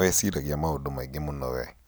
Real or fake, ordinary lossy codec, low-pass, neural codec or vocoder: real; none; none; none